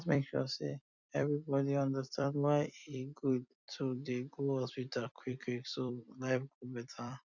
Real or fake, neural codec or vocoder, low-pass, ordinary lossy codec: fake; vocoder, 44.1 kHz, 128 mel bands every 256 samples, BigVGAN v2; 7.2 kHz; none